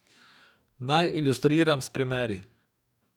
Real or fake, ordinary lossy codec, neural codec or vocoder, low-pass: fake; none; codec, 44.1 kHz, 2.6 kbps, DAC; 19.8 kHz